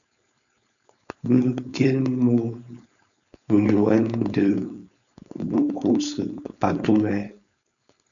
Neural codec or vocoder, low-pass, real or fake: codec, 16 kHz, 4.8 kbps, FACodec; 7.2 kHz; fake